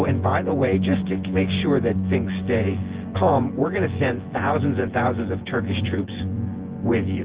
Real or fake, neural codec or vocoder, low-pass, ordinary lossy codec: fake; vocoder, 24 kHz, 100 mel bands, Vocos; 3.6 kHz; Opus, 16 kbps